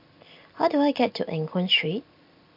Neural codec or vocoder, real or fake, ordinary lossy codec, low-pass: none; real; MP3, 48 kbps; 5.4 kHz